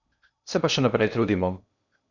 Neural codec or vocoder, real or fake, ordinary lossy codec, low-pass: codec, 16 kHz in and 24 kHz out, 0.8 kbps, FocalCodec, streaming, 65536 codes; fake; none; 7.2 kHz